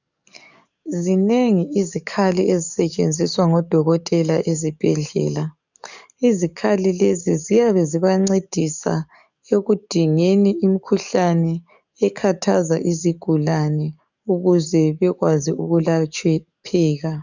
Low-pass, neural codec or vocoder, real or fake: 7.2 kHz; codec, 44.1 kHz, 7.8 kbps, DAC; fake